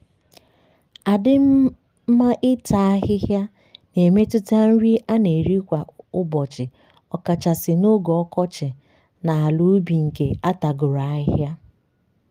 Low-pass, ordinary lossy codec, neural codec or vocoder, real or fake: 14.4 kHz; Opus, 32 kbps; none; real